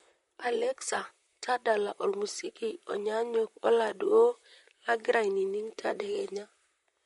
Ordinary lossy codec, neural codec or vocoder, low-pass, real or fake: MP3, 48 kbps; vocoder, 44.1 kHz, 128 mel bands, Pupu-Vocoder; 19.8 kHz; fake